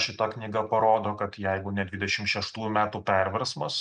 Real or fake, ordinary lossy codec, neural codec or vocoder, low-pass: real; MP3, 96 kbps; none; 9.9 kHz